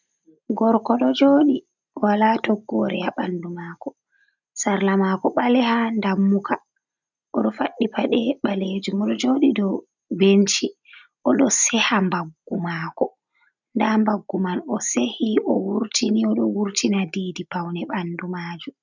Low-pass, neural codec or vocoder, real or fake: 7.2 kHz; none; real